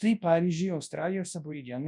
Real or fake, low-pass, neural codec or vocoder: fake; 10.8 kHz; codec, 24 kHz, 0.9 kbps, WavTokenizer, large speech release